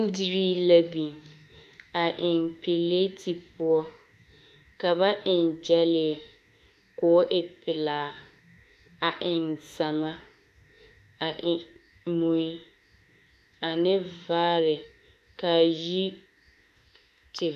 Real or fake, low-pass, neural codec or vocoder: fake; 14.4 kHz; autoencoder, 48 kHz, 32 numbers a frame, DAC-VAE, trained on Japanese speech